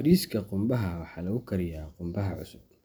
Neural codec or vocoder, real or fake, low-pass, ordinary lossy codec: none; real; none; none